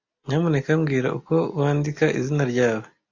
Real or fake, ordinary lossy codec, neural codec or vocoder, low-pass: real; AAC, 48 kbps; none; 7.2 kHz